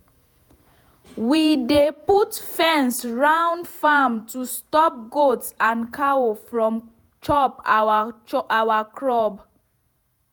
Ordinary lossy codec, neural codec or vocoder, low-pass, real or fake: none; vocoder, 48 kHz, 128 mel bands, Vocos; none; fake